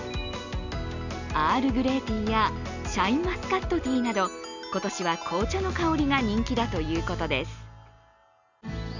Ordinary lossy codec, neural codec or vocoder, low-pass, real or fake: none; none; 7.2 kHz; real